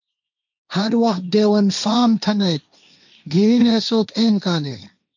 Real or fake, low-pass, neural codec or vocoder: fake; 7.2 kHz; codec, 16 kHz, 1.1 kbps, Voila-Tokenizer